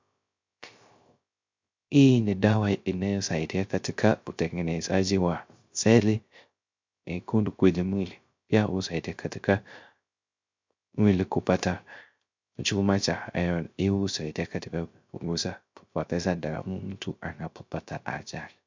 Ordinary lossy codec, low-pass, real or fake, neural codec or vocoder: MP3, 64 kbps; 7.2 kHz; fake; codec, 16 kHz, 0.3 kbps, FocalCodec